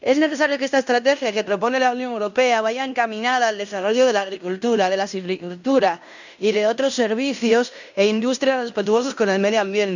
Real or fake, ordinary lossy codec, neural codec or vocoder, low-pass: fake; none; codec, 16 kHz in and 24 kHz out, 0.9 kbps, LongCat-Audio-Codec, fine tuned four codebook decoder; 7.2 kHz